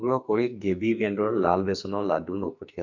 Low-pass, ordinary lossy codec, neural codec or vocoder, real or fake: 7.2 kHz; none; codec, 44.1 kHz, 2.6 kbps, SNAC; fake